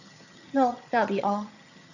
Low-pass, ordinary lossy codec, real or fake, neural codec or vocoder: 7.2 kHz; none; fake; vocoder, 22.05 kHz, 80 mel bands, HiFi-GAN